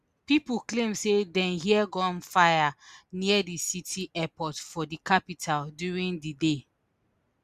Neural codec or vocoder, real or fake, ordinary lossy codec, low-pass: none; real; Opus, 64 kbps; 14.4 kHz